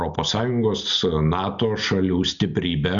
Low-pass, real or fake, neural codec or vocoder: 7.2 kHz; real; none